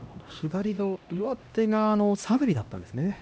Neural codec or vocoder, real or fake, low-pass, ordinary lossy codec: codec, 16 kHz, 1 kbps, X-Codec, HuBERT features, trained on LibriSpeech; fake; none; none